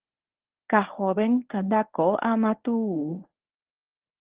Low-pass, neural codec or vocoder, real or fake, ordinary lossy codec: 3.6 kHz; codec, 24 kHz, 0.9 kbps, WavTokenizer, medium speech release version 1; fake; Opus, 16 kbps